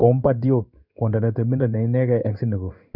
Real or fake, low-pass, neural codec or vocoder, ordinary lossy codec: fake; 5.4 kHz; codec, 16 kHz in and 24 kHz out, 1 kbps, XY-Tokenizer; none